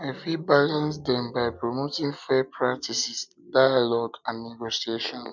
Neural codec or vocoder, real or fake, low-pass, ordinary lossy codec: none; real; 7.2 kHz; none